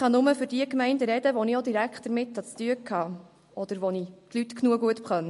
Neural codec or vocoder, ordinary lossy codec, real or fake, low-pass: none; MP3, 48 kbps; real; 14.4 kHz